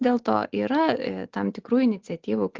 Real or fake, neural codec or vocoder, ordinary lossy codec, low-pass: real; none; Opus, 16 kbps; 7.2 kHz